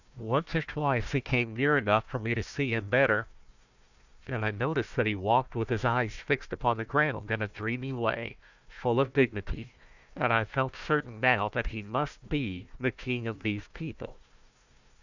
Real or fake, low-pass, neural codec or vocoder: fake; 7.2 kHz; codec, 16 kHz, 1 kbps, FunCodec, trained on Chinese and English, 50 frames a second